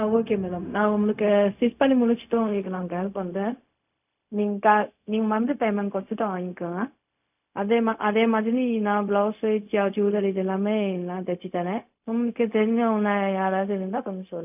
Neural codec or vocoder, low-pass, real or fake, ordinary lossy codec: codec, 16 kHz, 0.4 kbps, LongCat-Audio-Codec; 3.6 kHz; fake; none